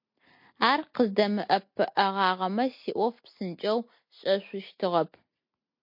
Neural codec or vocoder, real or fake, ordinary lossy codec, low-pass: none; real; MP3, 32 kbps; 5.4 kHz